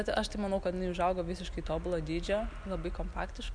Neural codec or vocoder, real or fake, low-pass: none; real; 9.9 kHz